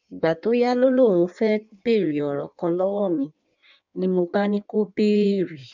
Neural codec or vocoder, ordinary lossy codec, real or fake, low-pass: codec, 16 kHz in and 24 kHz out, 1.1 kbps, FireRedTTS-2 codec; none; fake; 7.2 kHz